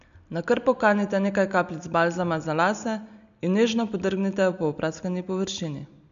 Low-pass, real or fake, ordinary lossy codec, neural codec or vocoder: 7.2 kHz; real; none; none